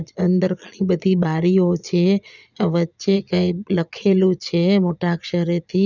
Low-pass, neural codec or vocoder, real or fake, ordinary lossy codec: 7.2 kHz; vocoder, 22.05 kHz, 80 mel bands, Vocos; fake; none